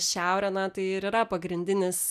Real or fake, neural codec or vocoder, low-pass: real; none; 14.4 kHz